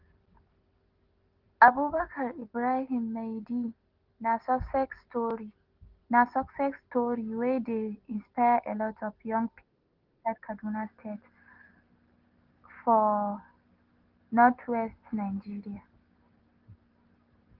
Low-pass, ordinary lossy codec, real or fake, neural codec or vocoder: 5.4 kHz; Opus, 16 kbps; real; none